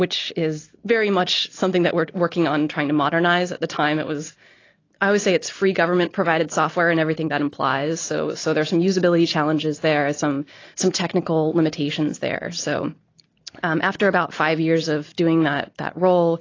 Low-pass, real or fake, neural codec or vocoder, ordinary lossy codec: 7.2 kHz; real; none; AAC, 32 kbps